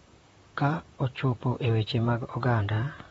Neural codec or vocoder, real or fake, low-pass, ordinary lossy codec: vocoder, 44.1 kHz, 128 mel bands, Pupu-Vocoder; fake; 19.8 kHz; AAC, 24 kbps